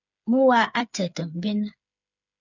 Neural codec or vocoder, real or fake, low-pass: codec, 16 kHz, 8 kbps, FreqCodec, smaller model; fake; 7.2 kHz